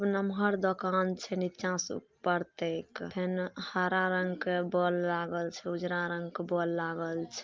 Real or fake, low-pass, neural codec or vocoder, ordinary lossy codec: real; 7.2 kHz; none; Opus, 24 kbps